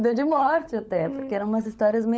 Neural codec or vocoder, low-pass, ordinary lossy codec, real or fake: codec, 16 kHz, 16 kbps, FunCodec, trained on LibriTTS, 50 frames a second; none; none; fake